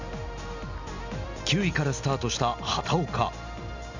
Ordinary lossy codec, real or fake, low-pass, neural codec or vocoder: none; real; 7.2 kHz; none